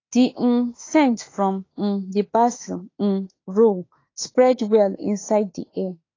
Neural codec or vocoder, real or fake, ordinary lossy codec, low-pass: autoencoder, 48 kHz, 32 numbers a frame, DAC-VAE, trained on Japanese speech; fake; AAC, 32 kbps; 7.2 kHz